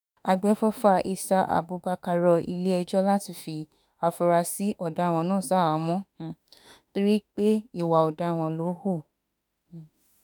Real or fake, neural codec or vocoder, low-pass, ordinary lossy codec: fake; autoencoder, 48 kHz, 32 numbers a frame, DAC-VAE, trained on Japanese speech; none; none